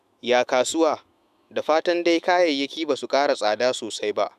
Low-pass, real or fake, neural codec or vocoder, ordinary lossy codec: 14.4 kHz; fake; autoencoder, 48 kHz, 128 numbers a frame, DAC-VAE, trained on Japanese speech; none